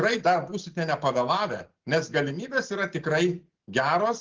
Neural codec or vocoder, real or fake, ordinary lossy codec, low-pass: none; real; Opus, 16 kbps; 7.2 kHz